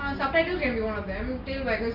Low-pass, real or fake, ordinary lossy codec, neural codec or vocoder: 5.4 kHz; real; none; none